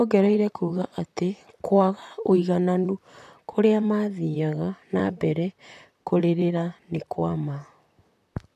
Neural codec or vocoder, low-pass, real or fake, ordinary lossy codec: vocoder, 44.1 kHz, 128 mel bands, Pupu-Vocoder; 14.4 kHz; fake; none